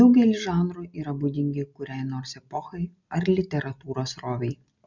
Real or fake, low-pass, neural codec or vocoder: real; 7.2 kHz; none